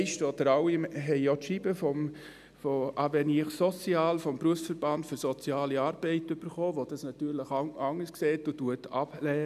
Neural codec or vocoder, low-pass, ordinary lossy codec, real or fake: none; 14.4 kHz; none; real